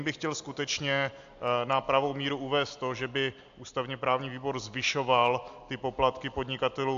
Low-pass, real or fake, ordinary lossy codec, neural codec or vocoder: 7.2 kHz; real; AAC, 64 kbps; none